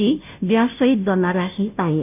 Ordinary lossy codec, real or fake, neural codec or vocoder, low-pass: none; fake; codec, 16 kHz, 0.5 kbps, FunCodec, trained on Chinese and English, 25 frames a second; 3.6 kHz